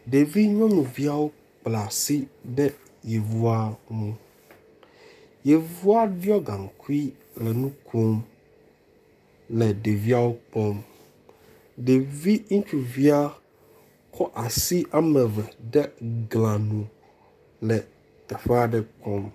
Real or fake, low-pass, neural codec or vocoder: fake; 14.4 kHz; codec, 44.1 kHz, 7.8 kbps, Pupu-Codec